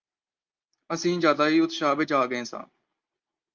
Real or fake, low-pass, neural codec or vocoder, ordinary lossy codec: real; 7.2 kHz; none; Opus, 24 kbps